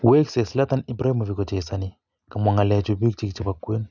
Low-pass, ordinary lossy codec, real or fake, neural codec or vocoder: 7.2 kHz; none; real; none